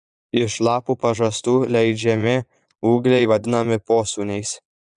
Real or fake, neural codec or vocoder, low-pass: fake; vocoder, 22.05 kHz, 80 mel bands, Vocos; 9.9 kHz